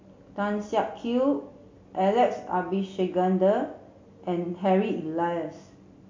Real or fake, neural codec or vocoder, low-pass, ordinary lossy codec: real; none; 7.2 kHz; MP3, 48 kbps